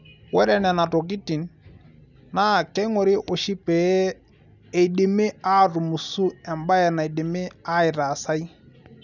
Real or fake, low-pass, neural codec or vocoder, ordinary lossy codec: real; 7.2 kHz; none; none